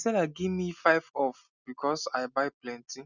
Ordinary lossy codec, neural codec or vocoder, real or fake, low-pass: none; none; real; 7.2 kHz